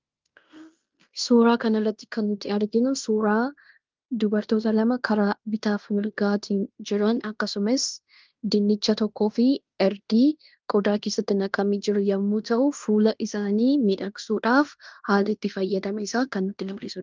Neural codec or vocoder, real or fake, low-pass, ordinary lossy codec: codec, 16 kHz in and 24 kHz out, 0.9 kbps, LongCat-Audio-Codec, fine tuned four codebook decoder; fake; 7.2 kHz; Opus, 24 kbps